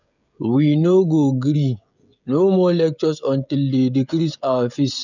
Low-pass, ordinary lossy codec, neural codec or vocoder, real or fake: 7.2 kHz; none; codec, 16 kHz, 16 kbps, FreqCodec, smaller model; fake